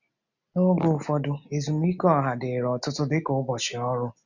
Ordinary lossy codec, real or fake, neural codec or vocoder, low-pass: none; real; none; 7.2 kHz